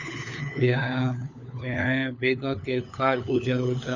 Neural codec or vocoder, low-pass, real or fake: codec, 16 kHz, 4 kbps, FunCodec, trained on LibriTTS, 50 frames a second; 7.2 kHz; fake